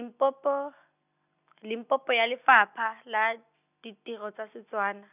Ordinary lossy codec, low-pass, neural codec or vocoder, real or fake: none; 3.6 kHz; none; real